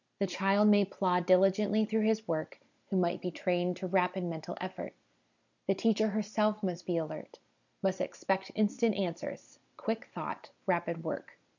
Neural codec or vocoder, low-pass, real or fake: none; 7.2 kHz; real